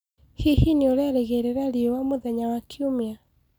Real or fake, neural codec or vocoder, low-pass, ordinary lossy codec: real; none; none; none